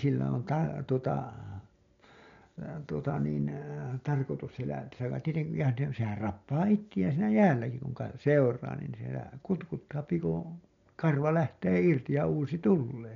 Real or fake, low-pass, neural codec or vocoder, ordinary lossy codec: real; 7.2 kHz; none; MP3, 64 kbps